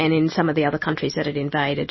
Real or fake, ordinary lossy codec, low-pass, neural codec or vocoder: real; MP3, 24 kbps; 7.2 kHz; none